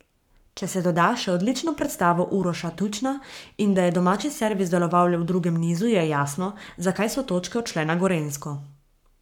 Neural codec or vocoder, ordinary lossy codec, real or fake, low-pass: codec, 44.1 kHz, 7.8 kbps, Pupu-Codec; none; fake; 19.8 kHz